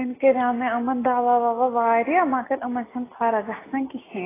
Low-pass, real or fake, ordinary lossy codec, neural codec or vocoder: 3.6 kHz; real; AAC, 16 kbps; none